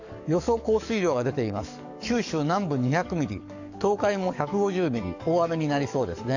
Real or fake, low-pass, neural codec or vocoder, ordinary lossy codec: fake; 7.2 kHz; codec, 44.1 kHz, 7.8 kbps, DAC; none